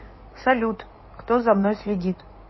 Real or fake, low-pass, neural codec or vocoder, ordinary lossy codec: fake; 7.2 kHz; codec, 16 kHz in and 24 kHz out, 2.2 kbps, FireRedTTS-2 codec; MP3, 24 kbps